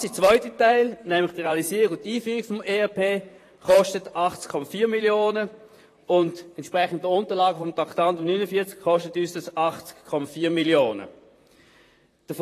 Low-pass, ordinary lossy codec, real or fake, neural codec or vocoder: 14.4 kHz; AAC, 48 kbps; fake; vocoder, 44.1 kHz, 128 mel bands, Pupu-Vocoder